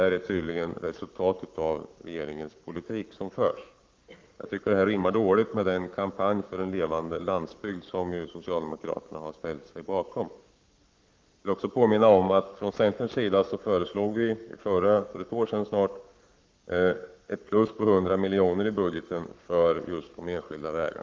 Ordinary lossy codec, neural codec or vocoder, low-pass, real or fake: Opus, 24 kbps; codec, 44.1 kHz, 7.8 kbps, Pupu-Codec; 7.2 kHz; fake